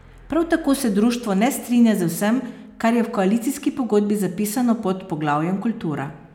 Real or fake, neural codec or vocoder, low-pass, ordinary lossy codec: real; none; 19.8 kHz; none